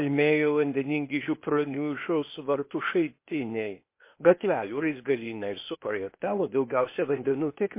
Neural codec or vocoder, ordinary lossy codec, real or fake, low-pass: codec, 16 kHz, 0.8 kbps, ZipCodec; MP3, 24 kbps; fake; 3.6 kHz